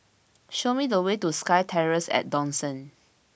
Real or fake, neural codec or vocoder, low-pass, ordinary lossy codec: real; none; none; none